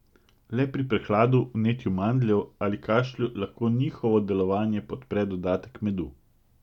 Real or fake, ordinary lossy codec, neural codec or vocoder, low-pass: real; none; none; 19.8 kHz